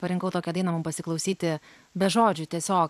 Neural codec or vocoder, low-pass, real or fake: vocoder, 44.1 kHz, 128 mel bands every 256 samples, BigVGAN v2; 14.4 kHz; fake